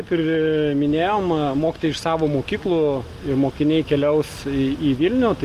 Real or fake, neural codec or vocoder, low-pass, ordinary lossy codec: real; none; 14.4 kHz; Opus, 32 kbps